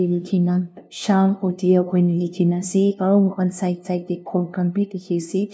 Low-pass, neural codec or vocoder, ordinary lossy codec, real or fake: none; codec, 16 kHz, 0.5 kbps, FunCodec, trained on LibriTTS, 25 frames a second; none; fake